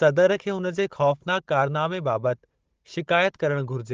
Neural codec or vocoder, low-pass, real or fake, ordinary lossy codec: codec, 16 kHz, 16 kbps, FunCodec, trained on Chinese and English, 50 frames a second; 7.2 kHz; fake; Opus, 16 kbps